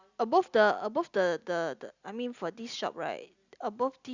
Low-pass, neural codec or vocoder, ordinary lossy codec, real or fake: 7.2 kHz; none; Opus, 64 kbps; real